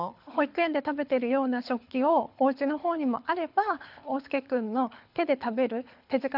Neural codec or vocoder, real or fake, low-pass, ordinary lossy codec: codec, 24 kHz, 6 kbps, HILCodec; fake; 5.4 kHz; none